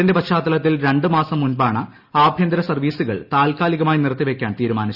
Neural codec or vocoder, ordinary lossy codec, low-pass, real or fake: none; Opus, 64 kbps; 5.4 kHz; real